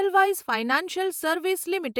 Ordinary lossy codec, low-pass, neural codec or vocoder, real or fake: none; none; vocoder, 48 kHz, 128 mel bands, Vocos; fake